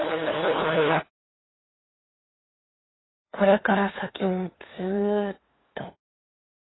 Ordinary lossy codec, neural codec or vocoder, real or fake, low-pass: AAC, 16 kbps; codec, 16 kHz, 2 kbps, FunCodec, trained on LibriTTS, 25 frames a second; fake; 7.2 kHz